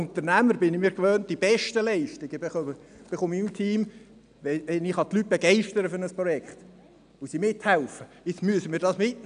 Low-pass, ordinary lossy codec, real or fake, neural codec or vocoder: 9.9 kHz; none; real; none